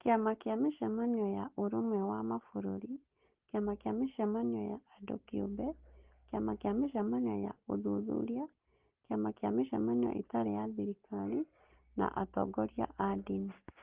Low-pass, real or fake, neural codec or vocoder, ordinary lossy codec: 3.6 kHz; real; none; Opus, 16 kbps